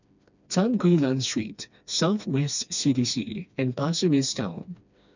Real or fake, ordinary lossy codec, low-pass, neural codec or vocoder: fake; none; 7.2 kHz; codec, 16 kHz, 2 kbps, FreqCodec, smaller model